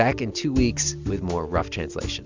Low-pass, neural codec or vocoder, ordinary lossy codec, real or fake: 7.2 kHz; none; MP3, 64 kbps; real